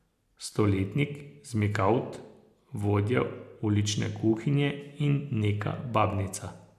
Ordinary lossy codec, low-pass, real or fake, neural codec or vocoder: none; 14.4 kHz; real; none